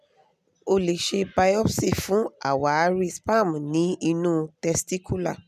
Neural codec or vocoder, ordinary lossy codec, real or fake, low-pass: none; none; real; 14.4 kHz